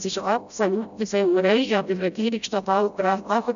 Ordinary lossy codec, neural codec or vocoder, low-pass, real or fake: none; codec, 16 kHz, 0.5 kbps, FreqCodec, smaller model; 7.2 kHz; fake